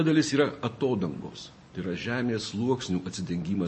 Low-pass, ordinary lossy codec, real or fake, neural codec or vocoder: 10.8 kHz; MP3, 32 kbps; real; none